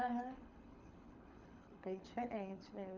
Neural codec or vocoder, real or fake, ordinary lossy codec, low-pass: codec, 24 kHz, 6 kbps, HILCodec; fake; MP3, 64 kbps; 7.2 kHz